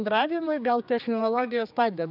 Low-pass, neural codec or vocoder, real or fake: 5.4 kHz; codec, 16 kHz, 4 kbps, X-Codec, HuBERT features, trained on general audio; fake